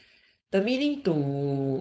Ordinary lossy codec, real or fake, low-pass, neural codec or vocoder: none; fake; none; codec, 16 kHz, 4.8 kbps, FACodec